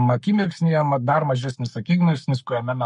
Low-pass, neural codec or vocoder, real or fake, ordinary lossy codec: 14.4 kHz; codec, 44.1 kHz, 7.8 kbps, Pupu-Codec; fake; MP3, 48 kbps